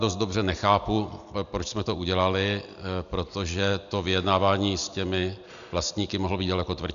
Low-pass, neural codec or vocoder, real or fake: 7.2 kHz; none; real